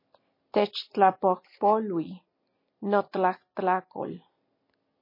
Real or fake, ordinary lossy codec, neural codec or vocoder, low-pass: real; MP3, 24 kbps; none; 5.4 kHz